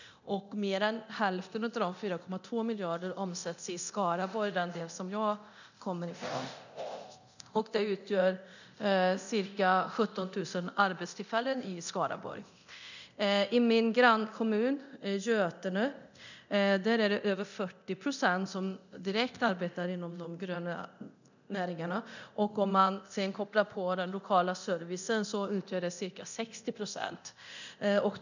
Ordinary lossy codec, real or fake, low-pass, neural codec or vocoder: none; fake; 7.2 kHz; codec, 24 kHz, 0.9 kbps, DualCodec